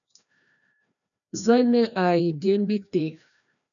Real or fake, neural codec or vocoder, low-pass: fake; codec, 16 kHz, 1 kbps, FreqCodec, larger model; 7.2 kHz